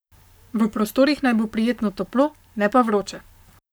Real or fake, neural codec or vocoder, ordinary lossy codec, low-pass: fake; codec, 44.1 kHz, 7.8 kbps, Pupu-Codec; none; none